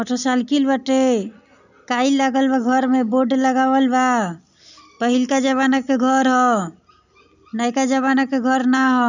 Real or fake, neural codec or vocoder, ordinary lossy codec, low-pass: real; none; none; 7.2 kHz